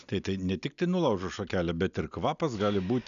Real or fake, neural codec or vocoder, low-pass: real; none; 7.2 kHz